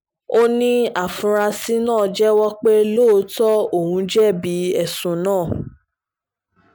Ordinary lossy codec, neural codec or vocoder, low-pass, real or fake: none; none; none; real